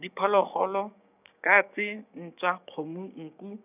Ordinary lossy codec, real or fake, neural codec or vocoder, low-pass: none; fake; codec, 16 kHz, 6 kbps, DAC; 3.6 kHz